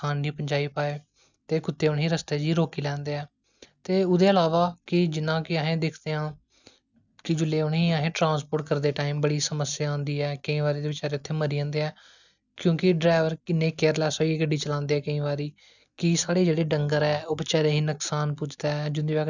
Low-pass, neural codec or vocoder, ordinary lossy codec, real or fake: 7.2 kHz; none; none; real